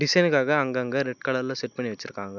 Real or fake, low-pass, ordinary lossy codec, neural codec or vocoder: real; 7.2 kHz; none; none